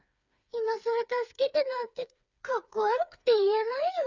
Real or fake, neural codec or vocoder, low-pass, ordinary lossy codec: fake; codec, 16 kHz, 4 kbps, FreqCodec, smaller model; 7.2 kHz; Opus, 64 kbps